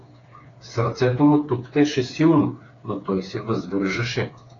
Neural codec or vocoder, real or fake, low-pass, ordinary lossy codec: codec, 16 kHz, 4 kbps, FreqCodec, smaller model; fake; 7.2 kHz; AAC, 32 kbps